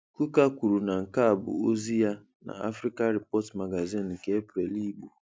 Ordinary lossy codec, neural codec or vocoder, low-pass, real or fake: none; none; none; real